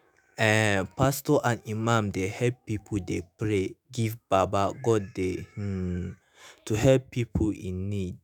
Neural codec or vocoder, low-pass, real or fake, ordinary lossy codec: autoencoder, 48 kHz, 128 numbers a frame, DAC-VAE, trained on Japanese speech; none; fake; none